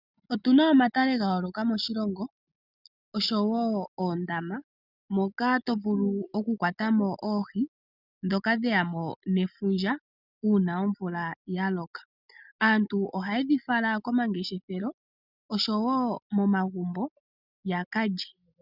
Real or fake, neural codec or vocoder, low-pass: real; none; 5.4 kHz